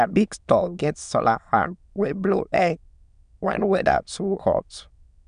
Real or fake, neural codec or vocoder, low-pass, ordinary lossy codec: fake; autoencoder, 22.05 kHz, a latent of 192 numbers a frame, VITS, trained on many speakers; 9.9 kHz; none